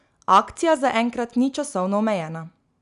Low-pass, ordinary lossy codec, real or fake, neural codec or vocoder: 10.8 kHz; none; real; none